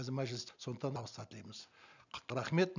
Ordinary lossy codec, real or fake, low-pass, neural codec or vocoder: none; real; 7.2 kHz; none